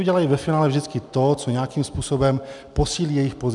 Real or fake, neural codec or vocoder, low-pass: real; none; 10.8 kHz